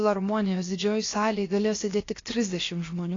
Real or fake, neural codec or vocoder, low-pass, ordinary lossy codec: fake; codec, 16 kHz, 0.7 kbps, FocalCodec; 7.2 kHz; AAC, 32 kbps